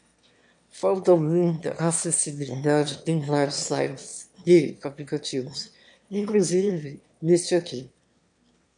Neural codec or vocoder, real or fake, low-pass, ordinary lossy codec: autoencoder, 22.05 kHz, a latent of 192 numbers a frame, VITS, trained on one speaker; fake; 9.9 kHz; MP3, 96 kbps